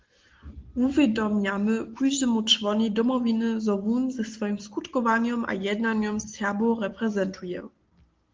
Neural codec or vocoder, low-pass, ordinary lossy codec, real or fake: none; 7.2 kHz; Opus, 16 kbps; real